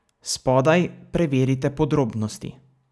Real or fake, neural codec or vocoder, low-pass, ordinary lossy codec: real; none; none; none